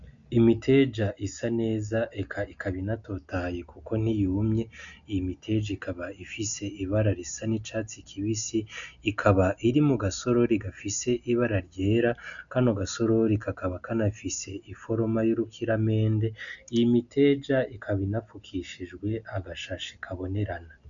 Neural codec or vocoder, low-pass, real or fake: none; 7.2 kHz; real